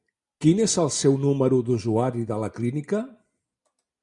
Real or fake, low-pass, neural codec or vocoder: real; 10.8 kHz; none